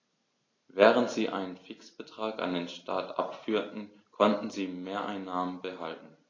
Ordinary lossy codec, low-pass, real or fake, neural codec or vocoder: AAC, 32 kbps; 7.2 kHz; real; none